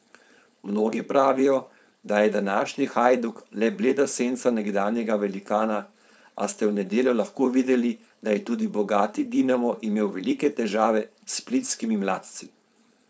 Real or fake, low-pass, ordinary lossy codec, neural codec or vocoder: fake; none; none; codec, 16 kHz, 4.8 kbps, FACodec